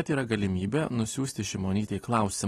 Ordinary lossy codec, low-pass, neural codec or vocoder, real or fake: AAC, 32 kbps; 19.8 kHz; none; real